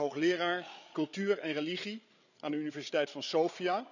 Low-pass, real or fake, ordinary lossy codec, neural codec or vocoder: 7.2 kHz; fake; none; codec, 16 kHz, 8 kbps, FreqCodec, larger model